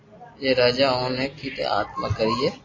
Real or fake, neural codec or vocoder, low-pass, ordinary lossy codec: real; none; 7.2 kHz; AAC, 32 kbps